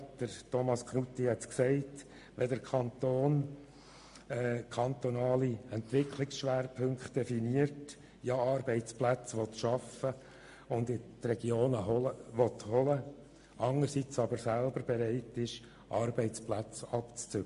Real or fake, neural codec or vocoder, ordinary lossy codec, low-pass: real; none; MP3, 48 kbps; 10.8 kHz